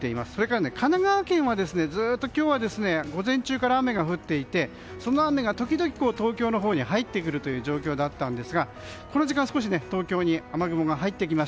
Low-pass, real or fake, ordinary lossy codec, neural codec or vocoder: none; real; none; none